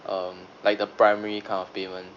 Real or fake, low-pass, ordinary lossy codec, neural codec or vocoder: real; 7.2 kHz; none; none